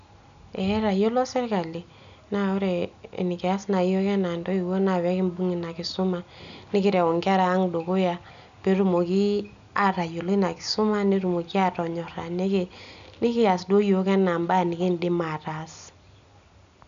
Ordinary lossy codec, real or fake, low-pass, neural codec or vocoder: AAC, 96 kbps; real; 7.2 kHz; none